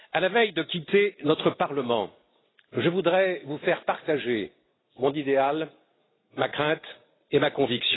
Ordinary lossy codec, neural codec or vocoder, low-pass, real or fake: AAC, 16 kbps; none; 7.2 kHz; real